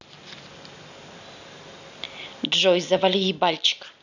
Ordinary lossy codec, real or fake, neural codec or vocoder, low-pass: none; real; none; 7.2 kHz